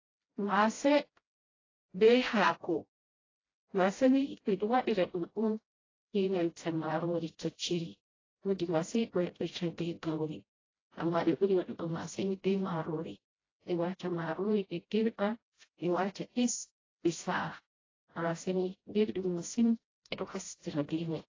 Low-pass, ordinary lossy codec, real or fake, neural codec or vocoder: 7.2 kHz; AAC, 32 kbps; fake; codec, 16 kHz, 0.5 kbps, FreqCodec, smaller model